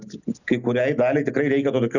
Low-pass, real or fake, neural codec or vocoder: 7.2 kHz; real; none